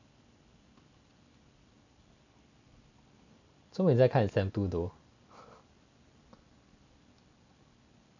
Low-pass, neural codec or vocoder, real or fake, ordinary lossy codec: 7.2 kHz; none; real; none